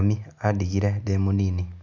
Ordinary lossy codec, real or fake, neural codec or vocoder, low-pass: none; real; none; 7.2 kHz